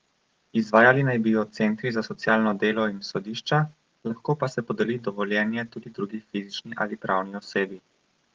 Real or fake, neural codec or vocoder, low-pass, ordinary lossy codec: real; none; 7.2 kHz; Opus, 16 kbps